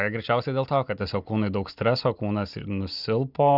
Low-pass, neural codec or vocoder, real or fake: 5.4 kHz; none; real